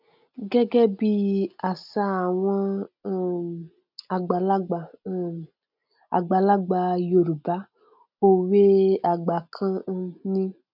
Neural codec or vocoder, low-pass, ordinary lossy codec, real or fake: none; 5.4 kHz; none; real